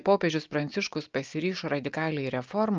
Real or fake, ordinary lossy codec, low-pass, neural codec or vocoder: real; Opus, 24 kbps; 7.2 kHz; none